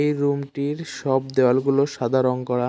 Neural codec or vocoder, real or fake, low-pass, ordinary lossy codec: none; real; none; none